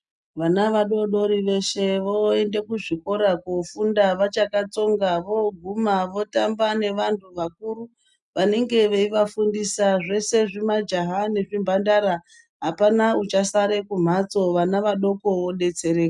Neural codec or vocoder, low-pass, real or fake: none; 10.8 kHz; real